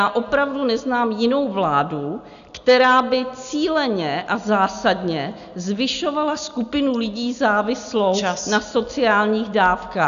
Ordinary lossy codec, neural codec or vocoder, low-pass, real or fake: AAC, 96 kbps; none; 7.2 kHz; real